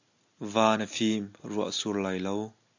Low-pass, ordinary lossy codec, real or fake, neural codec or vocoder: 7.2 kHz; AAC, 48 kbps; real; none